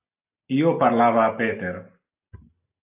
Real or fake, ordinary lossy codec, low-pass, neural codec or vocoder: real; AAC, 24 kbps; 3.6 kHz; none